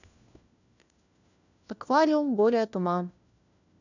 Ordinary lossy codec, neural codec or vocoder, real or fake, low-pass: none; codec, 16 kHz, 1 kbps, FunCodec, trained on LibriTTS, 50 frames a second; fake; 7.2 kHz